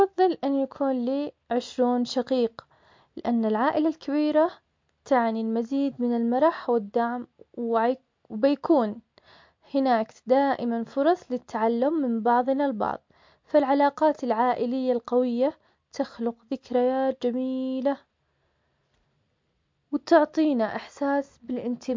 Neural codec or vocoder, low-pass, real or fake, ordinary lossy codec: none; 7.2 kHz; real; MP3, 48 kbps